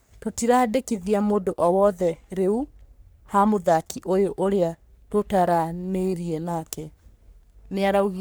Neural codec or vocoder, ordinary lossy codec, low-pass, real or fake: codec, 44.1 kHz, 3.4 kbps, Pupu-Codec; none; none; fake